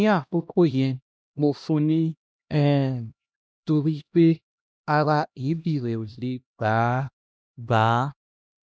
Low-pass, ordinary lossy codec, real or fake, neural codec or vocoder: none; none; fake; codec, 16 kHz, 1 kbps, X-Codec, HuBERT features, trained on LibriSpeech